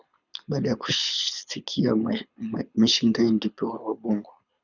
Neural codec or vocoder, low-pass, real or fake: codec, 24 kHz, 6 kbps, HILCodec; 7.2 kHz; fake